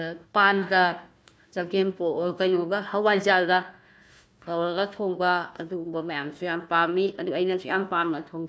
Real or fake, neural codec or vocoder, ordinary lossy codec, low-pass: fake; codec, 16 kHz, 1 kbps, FunCodec, trained on Chinese and English, 50 frames a second; none; none